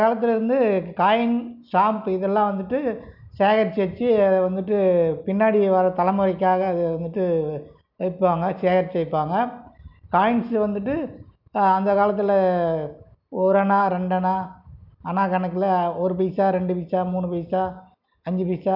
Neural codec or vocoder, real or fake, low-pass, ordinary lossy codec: none; real; 5.4 kHz; none